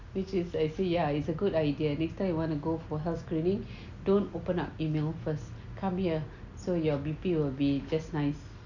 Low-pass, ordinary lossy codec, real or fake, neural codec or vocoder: 7.2 kHz; none; real; none